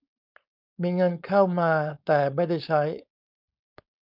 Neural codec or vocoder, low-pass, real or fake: codec, 16 kHz, 4.8 kbps, FACodec; 5.4 kHz; fake